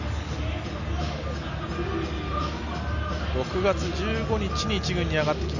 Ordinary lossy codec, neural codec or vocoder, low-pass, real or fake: none; none; 7.2 kHz; real